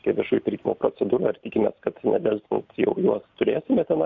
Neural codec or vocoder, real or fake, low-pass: none; real; 7.2 kHz